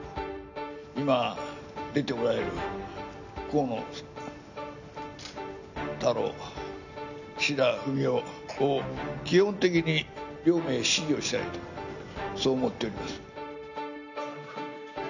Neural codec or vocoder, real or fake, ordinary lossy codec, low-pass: none; real; none; 7.2 kHz